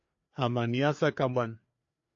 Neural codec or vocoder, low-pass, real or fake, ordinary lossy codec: codec, 16 kHz, 4 kbps, FreqCodec, larger model; 7.2 kHz; fake; AAC, 48 kbps